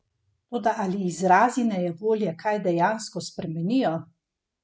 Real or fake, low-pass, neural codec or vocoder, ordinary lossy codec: real; none; none; none